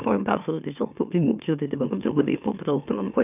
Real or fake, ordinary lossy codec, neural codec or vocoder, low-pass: fake; none; autoencoder, 44.1 kHz, a latent of 192 numbers a frame, MeloTTS; 3.6 kHz